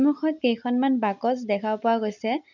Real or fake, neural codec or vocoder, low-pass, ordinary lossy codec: real; none; 7.2 kHz; none